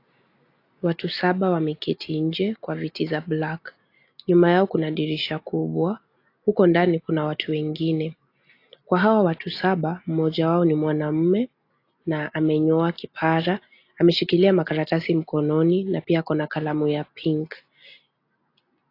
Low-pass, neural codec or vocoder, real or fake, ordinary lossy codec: 5.4 kHz; none; real; AAC, 32 kbps